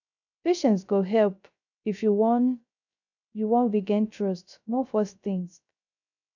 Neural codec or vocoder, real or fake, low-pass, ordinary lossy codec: codec, 16 kHz, 0.3 kbps, FocalCodec; fake; 7.2 kHz; none